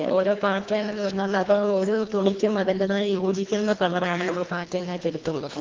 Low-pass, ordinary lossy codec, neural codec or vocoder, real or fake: 7.2 kHz; Opus, 32 kbps; codec, 24 kHz, 1.5 kbps, HILCodec; fake